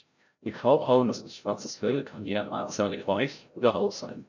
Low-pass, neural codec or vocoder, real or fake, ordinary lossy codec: 7.2 kHz; codec, 16 kHz, 0.5 kbps, FreqCodec, larger model; fake; none